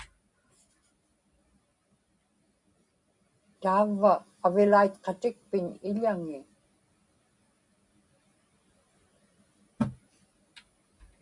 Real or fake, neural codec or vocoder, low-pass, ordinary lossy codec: real; none; 10.8 kHz; MP3, 64 kbps